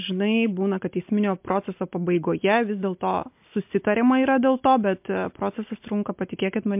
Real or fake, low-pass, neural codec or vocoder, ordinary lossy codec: real; 3.6 kHz; none; MP3, 32 kbps